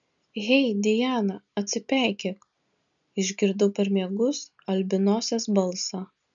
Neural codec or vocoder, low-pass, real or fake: none; 7.2 kHz; real